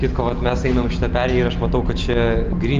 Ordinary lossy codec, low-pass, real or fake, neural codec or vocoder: Opus, 24 kbps; 7.2 kHz; real; none